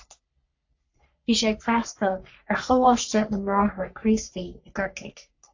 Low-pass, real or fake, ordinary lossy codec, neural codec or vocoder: 7.2 kHz; fake; AAC, 48 kbps; codec, 44.1 kHz, 3.4 kbps, Pupu-Codec